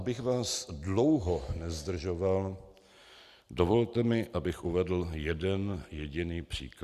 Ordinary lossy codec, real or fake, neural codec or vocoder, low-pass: Opus, 64 kbps; real; none; 14.4 kHz